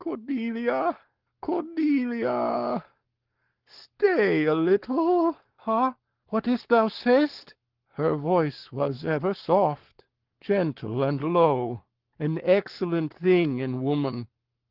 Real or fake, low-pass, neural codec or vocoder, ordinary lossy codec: fake; 5.4 kHz; codec, 44.1 kHz, 7.8 kbps, Pupu-Codec; Opus, 16 kbps